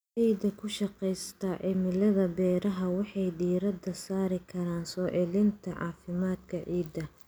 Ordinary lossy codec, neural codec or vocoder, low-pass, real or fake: none; none; none; real